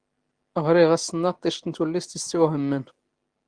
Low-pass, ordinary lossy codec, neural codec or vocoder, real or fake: 9.9 kHz; Opus, 16 kbps; none; real